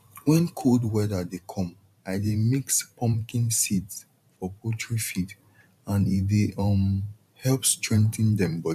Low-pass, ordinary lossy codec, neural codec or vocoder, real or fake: 14.4 kHz; none; vocoder, 44.1 kHz, 128 mel bands every 512 samples, BigVGAN v2; fake